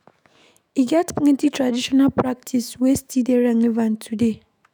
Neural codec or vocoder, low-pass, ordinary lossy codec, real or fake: autoencoder, 48 kHz, 128 numbers a frame, DAC-VAE, trained on Japanese speech; none; none; fake